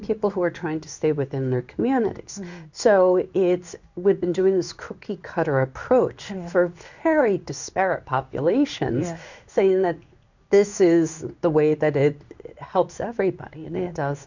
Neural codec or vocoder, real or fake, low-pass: codec, 16 kHz in and 24 kHz out, 1 kbps, XY-Tokenizer; fake; 7.2 kHz